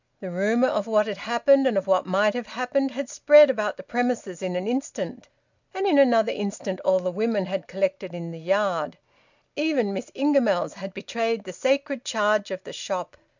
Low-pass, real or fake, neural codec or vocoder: 7.2 kHz; real; none